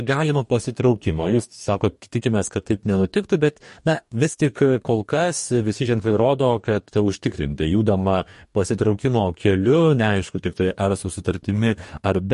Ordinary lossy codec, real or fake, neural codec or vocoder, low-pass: MP3, 48 kbps; fake; codec, 44.1 kHz, 2.6 kbps, DAC; 14.4 kHz